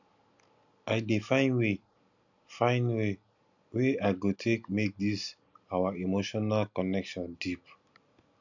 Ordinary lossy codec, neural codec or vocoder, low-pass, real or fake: none; none; 7.2 kHz; real